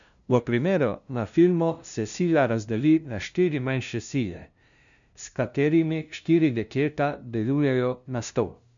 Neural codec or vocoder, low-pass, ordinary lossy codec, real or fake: codec, 16 kHz, 0.5 kbps, FunCodec, trained on LibriTTS, 25 frames a second; 7.2 kHz; none; fake